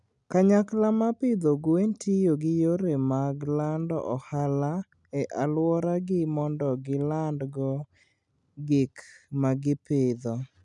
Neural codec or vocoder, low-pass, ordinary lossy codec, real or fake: none; 10.8 kHz; none; real